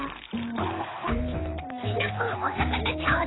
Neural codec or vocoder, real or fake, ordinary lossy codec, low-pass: codec, 24 kHz, 6 kbps, HILCodec; fake; AAC, 16 kbps; 7.2 kHz